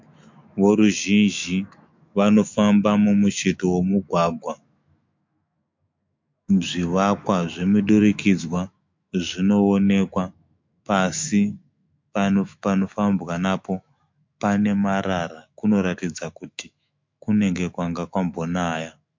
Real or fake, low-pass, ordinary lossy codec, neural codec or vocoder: fake; 7.2 kHz; MP3, 48 kbps; autoencoder, 48 kHz, 128 numbers a frame, DAC-VAE, trained on Japanese speech